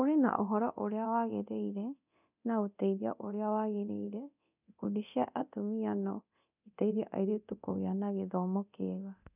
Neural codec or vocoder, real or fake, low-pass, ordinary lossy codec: codec, 24 kHz, 0.9 kbps, DualCodec; fake; 3.6 kHz; none